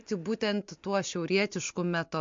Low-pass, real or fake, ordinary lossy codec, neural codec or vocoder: 7.2 kHz; real; MP3, 48 kbps; none